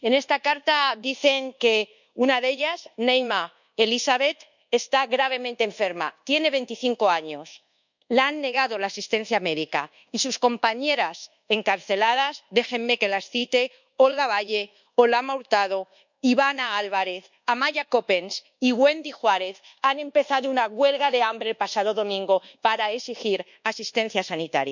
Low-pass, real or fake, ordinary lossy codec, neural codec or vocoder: 7.2 kHz; fake; none; codec, 24 kHz, 1.2 kbps, DualCodec